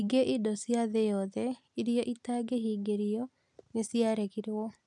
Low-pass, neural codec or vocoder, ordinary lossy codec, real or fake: 10.8 kHz; none; none; real